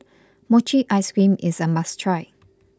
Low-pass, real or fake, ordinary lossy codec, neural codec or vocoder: none; real; none; none